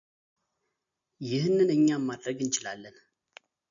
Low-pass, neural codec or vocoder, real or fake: 7.2 kHz; none; real